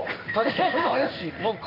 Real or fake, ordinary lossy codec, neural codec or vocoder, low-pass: fake; none; codec, 16 kHz in and 24 kHz out, 1 kbps, XY-Tokenizer; 5.4 kHz